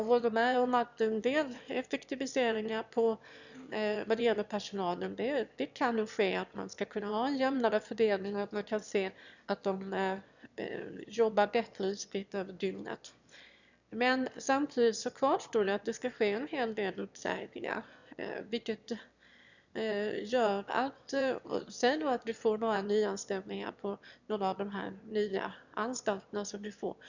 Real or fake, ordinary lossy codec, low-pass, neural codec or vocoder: fake; none; 7.2 kHz; autoencoder, 22.05 kHz, a latent of 192 numbers a frame, VITS, trained on one speaker